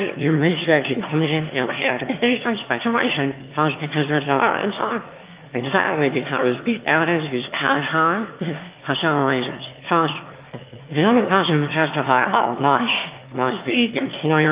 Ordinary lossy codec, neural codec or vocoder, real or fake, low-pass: Opus, 24 kbps; autoencoder, 22.05 kHz, a latent of 192 numbers a frame, VITS, trained on one speaker; fake; 3.6 kHz